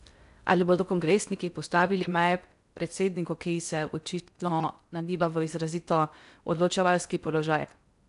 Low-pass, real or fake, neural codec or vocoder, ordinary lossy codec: 10.8 kHz; fake; codec, 16 kHz in and 24 kHz out, 0.6 kbps, FocalCodec, streaming, 4096 codes; none